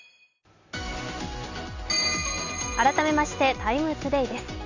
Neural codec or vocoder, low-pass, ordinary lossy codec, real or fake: none; 7.2 kHz; none; real